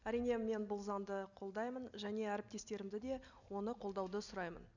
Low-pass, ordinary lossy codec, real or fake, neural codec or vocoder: 7.2 kHz; none; real; none